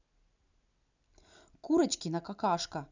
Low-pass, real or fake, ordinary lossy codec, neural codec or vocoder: 7.2 kHz; real; none; none